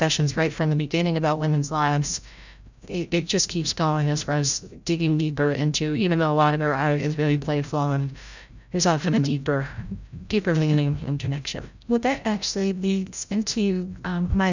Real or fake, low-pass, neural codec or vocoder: fake; 7.2 kHz; codec, 16 kHz, 0.5 kbps, FreqCodec, larger model